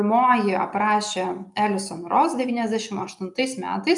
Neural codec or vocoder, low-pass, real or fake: none; 10.8 kHz; real